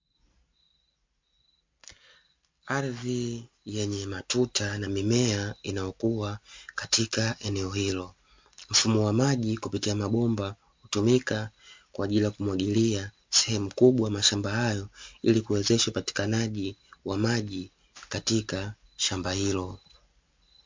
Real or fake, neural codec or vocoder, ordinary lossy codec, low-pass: real; none; MP3, 48 kbps; 7.2 kHz